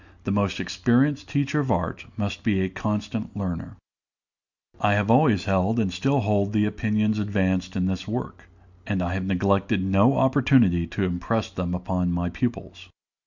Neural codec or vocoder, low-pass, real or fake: none; 7.2 kHz; real